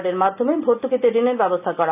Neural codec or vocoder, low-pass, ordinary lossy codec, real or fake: none; 3.6 kHz; none; real